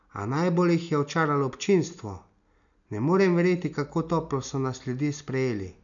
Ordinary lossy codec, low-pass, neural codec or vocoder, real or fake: none; 7.2 kHz; none; real